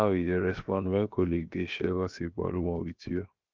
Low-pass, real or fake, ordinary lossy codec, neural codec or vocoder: 7.2 kHz; fake; Opus, 24 kbps; codec, 16 kHz, 0.7 kbps, FocalCodec